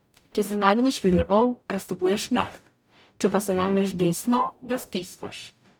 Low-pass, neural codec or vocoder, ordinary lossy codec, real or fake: none; codec, 44.1 kHz, 0.9 kbps, DAC; none; fake